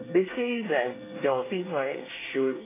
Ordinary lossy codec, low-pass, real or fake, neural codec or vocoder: AAC, 16 kbps; 3.6 kHz; fake; codec, 24 kHz, 1 kbps, SNAC